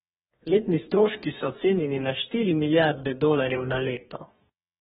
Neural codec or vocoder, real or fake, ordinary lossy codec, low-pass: codec, 44.1 kHz, 2.6 kbps, DAC; fake; AAC, 16 kbps; 19.8 kHz